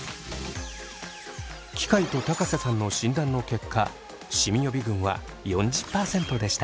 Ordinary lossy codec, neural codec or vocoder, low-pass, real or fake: none; none; none; real